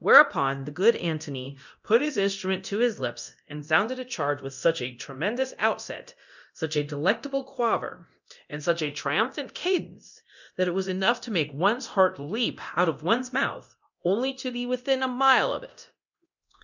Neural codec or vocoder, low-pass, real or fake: codec, 24 kHz, 0.9 kbps, DualCodec; 7.2 kHz; fake